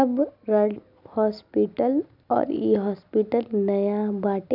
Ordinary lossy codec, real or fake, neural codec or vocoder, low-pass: none; real; none; 5.4 kHz